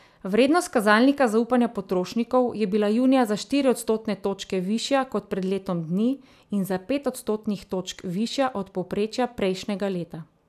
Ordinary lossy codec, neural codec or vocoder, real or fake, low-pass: none; none; real; 14.4 kHz